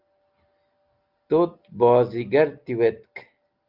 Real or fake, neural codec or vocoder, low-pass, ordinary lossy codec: real; none; 5.4 kHz; Opus, 32 kbps